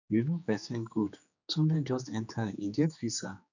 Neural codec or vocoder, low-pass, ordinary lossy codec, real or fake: codec, 16 kHz, 2 kbps, X-Codec, HuBERT features, trained on general audio; 7.2 kHz; none; fake